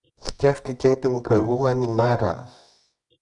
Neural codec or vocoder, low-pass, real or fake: codec, 24 kHz, 0.9 kbps, WavTokenizer, medium music audio release; 10.8 kHz; fake